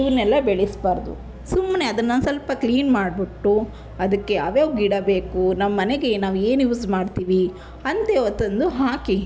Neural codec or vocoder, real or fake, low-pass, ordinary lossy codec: none; real; none; none